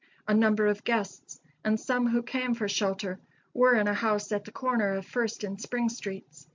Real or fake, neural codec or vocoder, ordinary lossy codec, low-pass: real; none; MP3, 64 kbps; 7.2 kHz